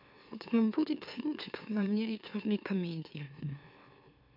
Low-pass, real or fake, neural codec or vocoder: 5.4 kHz; fake; autoencoder, 44.1 kHz, a latent of 192 numbers a frame, MeloTTS